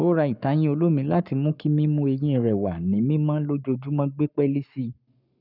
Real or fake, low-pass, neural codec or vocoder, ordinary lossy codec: fake; 5.4 kHz; codec, 44.1 kHz, 7.8 kbps, Pupu-Codec; none